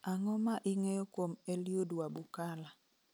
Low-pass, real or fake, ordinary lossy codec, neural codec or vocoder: none; real; none; none